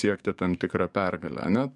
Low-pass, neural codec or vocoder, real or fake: 10.8 kHz; codec, 44.1 kHz, 7.8 kbps, DAC; fake